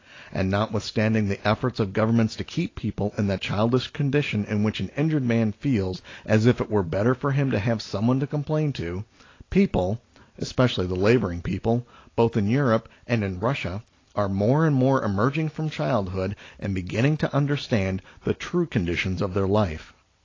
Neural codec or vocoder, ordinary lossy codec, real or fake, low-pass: none; AAC, 32 kbps; real; 7.2 kHz